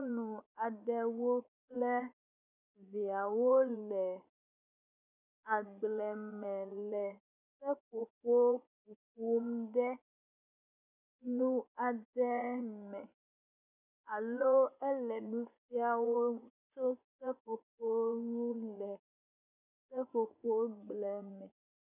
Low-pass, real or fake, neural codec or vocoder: 3.6 kHz; fake; vocoder, 24 kHz, 100 mel bands, Vocos